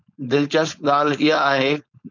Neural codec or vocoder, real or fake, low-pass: codec, 16 kHz, 4.8 kbps, FACodec; fake; 7.2 kHz